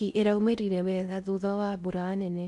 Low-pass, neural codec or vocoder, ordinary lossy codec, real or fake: 10.8 kHz; codec, 16 kHz in and 24 kHz out, 0.6 kbps, FocalCodec, streaming, 4096 codes; none; fake